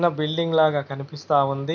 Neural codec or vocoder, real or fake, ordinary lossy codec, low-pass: none; real; none; 7.2 kHz